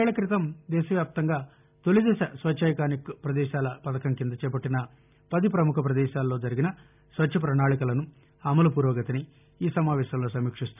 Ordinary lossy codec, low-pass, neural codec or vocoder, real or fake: none; 3.6 kHz; none; real